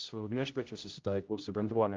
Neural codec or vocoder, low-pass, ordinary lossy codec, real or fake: codec, 16 kHz, 0.5 kbps, X-Codec, HuBERT features, trained on general audio; 7.2 kHz; Opus, 24 kbps; fake